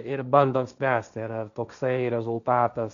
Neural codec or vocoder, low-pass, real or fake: codec, 16 kHz, 1.1 kbps, Voila-Tokenizer; 7.2 kHz; fake